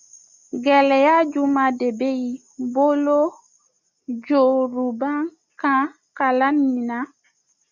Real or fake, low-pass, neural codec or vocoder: real; 7.2 kHz; none